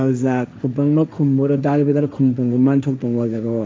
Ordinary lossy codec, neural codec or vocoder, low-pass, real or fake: none; codec, 16 kHz, 1.1 kbps, Voila-Tokenizer; none; fake